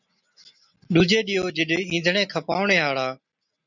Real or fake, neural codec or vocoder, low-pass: real; none; 7.2 kHz